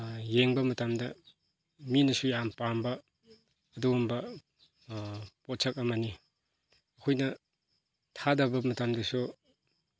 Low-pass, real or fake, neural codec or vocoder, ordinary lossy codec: none; real; none; none